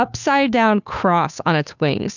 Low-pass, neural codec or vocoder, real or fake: 7.2 kHz; codec, 16 kHz, 2 kbps, FunCodec, trained on Chinese and English, 25 frames a second; fake